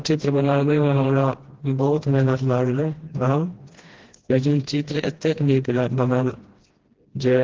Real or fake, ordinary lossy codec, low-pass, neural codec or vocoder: fake; Opus, 16 kbps; 7.2 kHz; codec, 16 kHz, 1 kbps, FreqCodec, smaller model